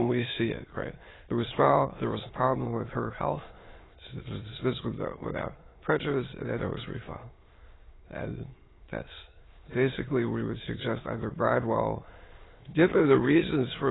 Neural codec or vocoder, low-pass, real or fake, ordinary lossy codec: autoencoder, 22.05 kHz, a latent of 192 numbers a frame, VITS, trained on many speakers; 7.2 kHz; fake; AAC, 16 kbps